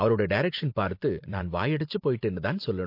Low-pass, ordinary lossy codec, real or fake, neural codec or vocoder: 5.4 kHz; MP3, 32 kbps; fake; vocoder, 44.1 kHz, 128 mel bands every 512 samples, BigVGAN v2